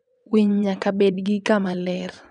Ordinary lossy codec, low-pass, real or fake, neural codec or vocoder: none; 9.9 kHz; fake; vocoder, 22.05 kHz, 80 mel bands, WaveNeXt